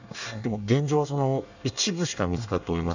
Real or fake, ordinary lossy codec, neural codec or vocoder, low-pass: fake; none; codec, 24 kHz, 1 kbps, SNAC; 7.2 kHz